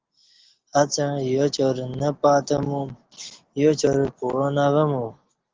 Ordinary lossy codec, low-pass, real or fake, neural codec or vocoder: Opus, 16 kbps; 7.2 kHz; real; none